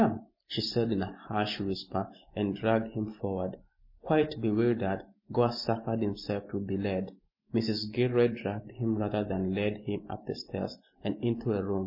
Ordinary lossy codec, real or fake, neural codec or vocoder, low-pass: MP3, 24 kbps; real; none; 5.4 kHz